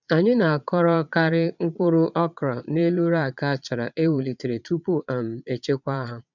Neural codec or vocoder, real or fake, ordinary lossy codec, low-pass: vocoder, 22.05 kHz, 80 mel bands, Vocos; fake; none; 7.2 kHz